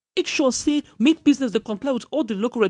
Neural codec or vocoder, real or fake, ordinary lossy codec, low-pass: codec, 24 kHz, 0.9 kbps, WavTokenizer, medium speech release version 2; fake; none; 10.8 kHz